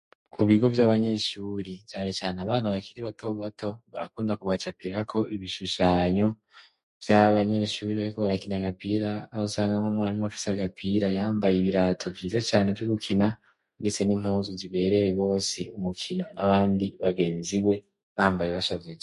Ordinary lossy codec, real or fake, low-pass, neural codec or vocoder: MP3, 48 kbps; fake; 14.4 kHz; codec, 32 kHz, 1.9 kbps, SNAC